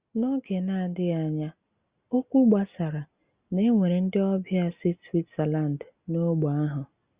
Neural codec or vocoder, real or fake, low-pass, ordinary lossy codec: none; real; 3.6 kHz; Opus, 64 kbps